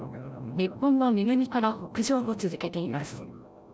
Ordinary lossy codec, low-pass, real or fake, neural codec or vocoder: none; none; fake; codec, 16 kHz, 0.5 kbps, FreqCodec, larger model